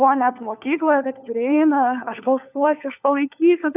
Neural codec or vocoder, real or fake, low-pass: codec, 16 kHz, 4 kbps, FunCodec, trained on LibriTTS, 50 frames a second; fake; 3.6 kHz